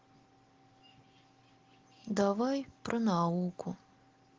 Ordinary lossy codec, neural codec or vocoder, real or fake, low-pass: Opus, 16 kbps; none; real; 7.2 kHz